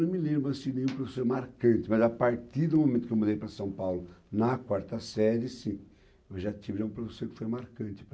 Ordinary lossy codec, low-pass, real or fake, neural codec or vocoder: none; none; real; none